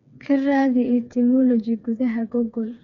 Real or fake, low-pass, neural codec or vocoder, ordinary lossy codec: fake; 7.2 kHz; codec, 16 kHz, 4 kbps, FreqCodec, smaller model; none